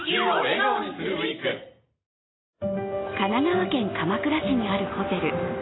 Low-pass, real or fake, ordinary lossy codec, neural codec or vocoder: 7.2 kHz; real; AAC, 16 kbps; none